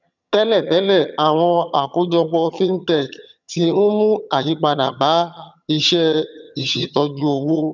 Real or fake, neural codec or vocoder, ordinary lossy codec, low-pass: fake; vocoder, 22.05 kHz, 80 mel bands, HiFi-GAN; none; 7.2 kHz